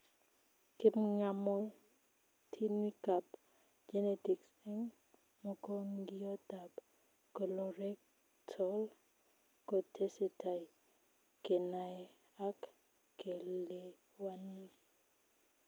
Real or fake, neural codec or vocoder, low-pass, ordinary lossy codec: real; none; none; none